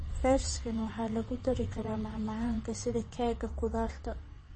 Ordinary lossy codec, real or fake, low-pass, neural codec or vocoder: MP3, 32 kbps; fake; 9.9 kHz; vocoder, 22.05 kHz, 80 mel bands, WaveNeXt